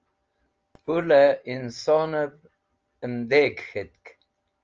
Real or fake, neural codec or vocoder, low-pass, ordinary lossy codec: real; none; 7.2 kHz; Opus, 24 kbps